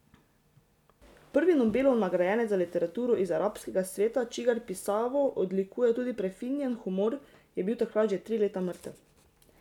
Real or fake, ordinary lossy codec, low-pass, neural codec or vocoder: real; none; 19.8 kHz; none